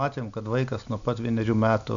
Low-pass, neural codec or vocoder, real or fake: 7.2 kHz; none; real